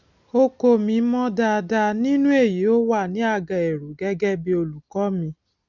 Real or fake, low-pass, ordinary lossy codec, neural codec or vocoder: real; 7.2 kHz; none; none